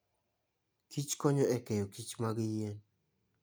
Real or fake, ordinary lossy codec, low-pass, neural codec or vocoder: real; none; none; none